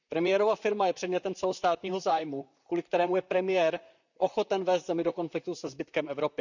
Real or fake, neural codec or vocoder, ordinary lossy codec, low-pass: fake; vocoder, 44.1 kHz, 128 mel bands, Pupu-Vocoder; none; 7.2 kHz